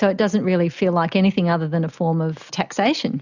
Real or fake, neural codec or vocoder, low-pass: real; none; 7.2 kHz